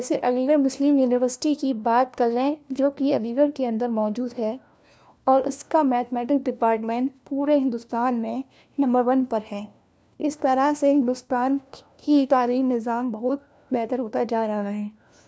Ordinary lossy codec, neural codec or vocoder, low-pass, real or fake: none; codec, 16 kHz, 1 kbps, FunCodec, trained on LibriTTS, 50 frames a second; none; fake